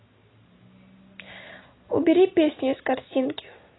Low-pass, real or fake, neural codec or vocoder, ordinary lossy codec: 7.2 kHz; real; none; AAC, 16 kbps